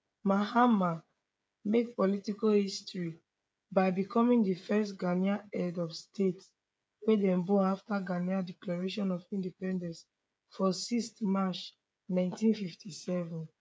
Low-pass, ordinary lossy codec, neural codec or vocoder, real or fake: none; none; codec, 16 kHz, 16 kbps, FreqCodec, smaller model; fake